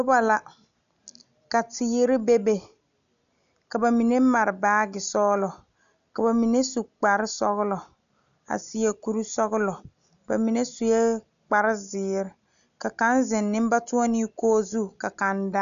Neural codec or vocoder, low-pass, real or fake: none; 7.2 kHz; real